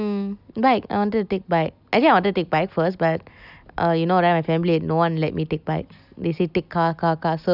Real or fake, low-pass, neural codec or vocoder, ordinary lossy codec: real; 5.4 kHz; none; none